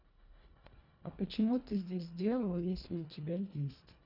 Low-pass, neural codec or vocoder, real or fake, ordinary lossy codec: 5.4 kHz; codec, 24 kHz, 1.5 kbps, HILCodec; fake; none